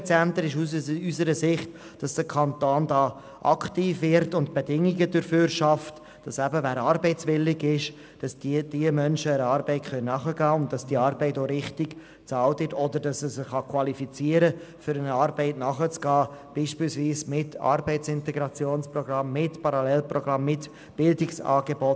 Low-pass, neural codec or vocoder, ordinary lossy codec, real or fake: none; none; none; real